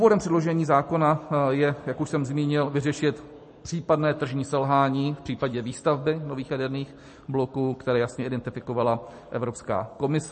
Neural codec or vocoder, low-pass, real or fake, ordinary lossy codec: none; 10.8 kHz; real; MP3, 32 kbps